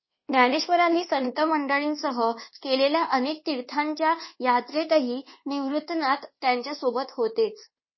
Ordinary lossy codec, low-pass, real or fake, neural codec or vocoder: MP3, 24 kbps; 7.2 kHz; fake; codec, 24 kHz, 1.2 kbps, DualCodec